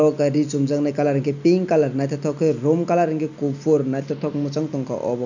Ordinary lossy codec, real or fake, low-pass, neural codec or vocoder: none; real; 7.2 kHz; none